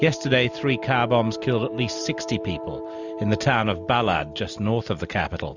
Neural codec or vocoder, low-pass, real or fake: none; 7.2 kHz; real